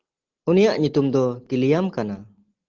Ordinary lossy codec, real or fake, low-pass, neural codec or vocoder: Opus, 16 kbps; real; 7.2 kHz; none